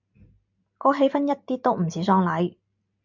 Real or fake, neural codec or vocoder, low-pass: real; none; 7.2 kHz